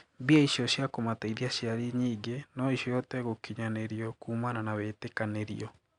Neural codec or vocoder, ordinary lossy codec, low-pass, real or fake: none; Opus, 64 kbps; 9.9 kHz; real